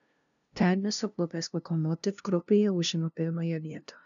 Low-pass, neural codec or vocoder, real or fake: 7.2 kHz; codec, 16 kHz, 0.5 kbps, FunCodec, trained on LibriTTS, 25 frames a second; fake